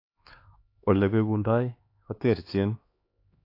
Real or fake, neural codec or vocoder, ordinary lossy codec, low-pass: fake; codec, 16 kHz, 2 kbps, X-Codec, HuBERT features, trained on LibriSpeech; AAC, 32 kbps; 5.4 kHz